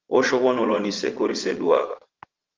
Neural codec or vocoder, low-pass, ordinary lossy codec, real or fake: vocoder, 22.05 kHz, 80 mel bands, WaveNeXt; 7.2 kHz; Opus, 32 kbps; fake